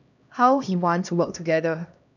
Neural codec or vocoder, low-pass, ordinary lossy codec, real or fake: codec, 16 kHz, 1 kbps, X-Codec, HuBERT features, trained on LibriSpeech; 7.2 kHz; none; fake